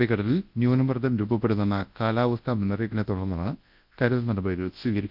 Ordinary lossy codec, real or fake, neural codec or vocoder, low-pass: Opus, 24 kbps; fake; codec, 24 kHz, 0.9 kbps, WavTokenizer, large speech release; 5.4 kHz